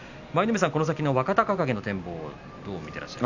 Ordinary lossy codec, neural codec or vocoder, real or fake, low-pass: none; none; real; 7.2 kHz